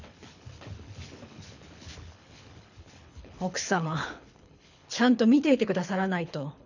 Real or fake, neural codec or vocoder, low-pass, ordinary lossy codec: fake; codec, 24 kHz, 6 kbps, HILCodec; 7.2 kHz; none